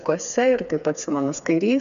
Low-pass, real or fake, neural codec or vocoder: 7.2 kHz; fake; codec, 16 kHz, 4 kbps, FreqCodec, smaller model